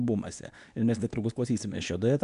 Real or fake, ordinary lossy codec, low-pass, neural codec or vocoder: fake; AAC, 96 kbps; 10.8 kHz; codec, 24 kHz, 0.9 kbps, WavTokenizer, medium speech release version 2